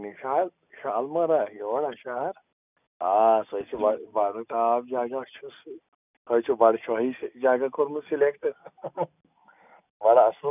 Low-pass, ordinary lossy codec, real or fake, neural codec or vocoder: 3.6 kHz; none; real; none